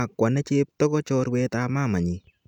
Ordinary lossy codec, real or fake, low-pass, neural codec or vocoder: none; real; 19.8 kHz; none